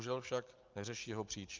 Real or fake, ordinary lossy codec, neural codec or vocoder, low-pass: real; Opus, 32 kbps; none; 7.2 kHz